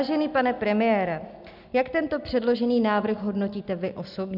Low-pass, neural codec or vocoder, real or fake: 5.4 kHz; none; real